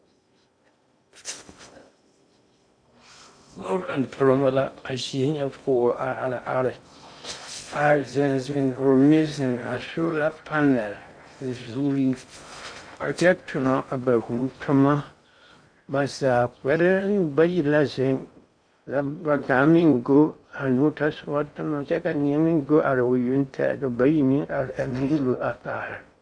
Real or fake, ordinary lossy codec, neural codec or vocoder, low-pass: fake; AAC, 64 kbps; codec, 16 kHz in and 24 kHz out, 0.6 kbps, FocalCodec, streaming, 2048 codes; 9.9 kHz